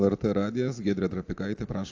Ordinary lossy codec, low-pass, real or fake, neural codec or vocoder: AAC, 48 kbps; 7.2 kHz; real; none